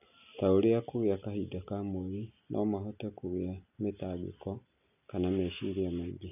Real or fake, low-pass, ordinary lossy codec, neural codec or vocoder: real; 3.6 kHz; none; none